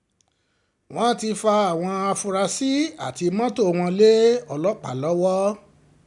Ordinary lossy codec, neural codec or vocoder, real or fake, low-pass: none; none; real; 10.8 kHz